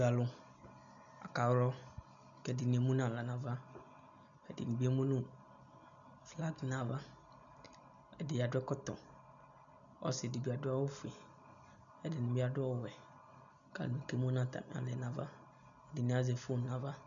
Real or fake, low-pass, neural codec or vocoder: real; 7.2 kHz; none